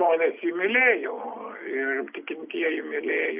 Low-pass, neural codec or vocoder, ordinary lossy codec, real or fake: 3.6 kHz; none; Opus, 64 kbps; real